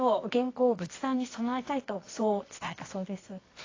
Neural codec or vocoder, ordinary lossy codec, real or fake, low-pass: codec, 24 kHz, 0.9 kbps, WavTokenizer, medium music audio release; AAC, 32 kbps; fake; 7.2 kHz